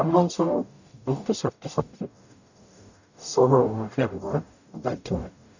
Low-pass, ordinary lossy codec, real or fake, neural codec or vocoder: 7.2 kHz; none; fake; codec, 44.1 kHz, 0.9 kbps, DAC